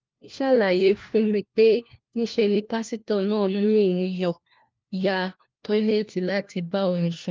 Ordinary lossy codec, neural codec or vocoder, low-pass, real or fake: Opus, 32 kbps; codec, 16 kHz, 1 kbps, FunCodec, trained on LibriTTS, 50 frames a second; 7.2 kHz; fake